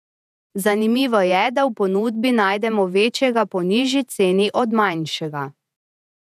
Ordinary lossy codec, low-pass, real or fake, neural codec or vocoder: none; 14.4 kHz; fake; vocoder, 48 kHz, 128 mel bands, Vocos